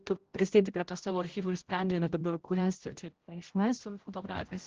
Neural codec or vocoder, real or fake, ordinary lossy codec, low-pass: codec, 16 kHz, 0.5 kbps, X-Codec, HuBERT features, trained on general audio; fake; Opus, 16 kbps; 7.2 kHz